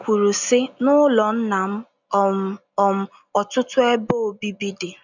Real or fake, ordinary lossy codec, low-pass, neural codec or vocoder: real; none; 7.2 kHz; none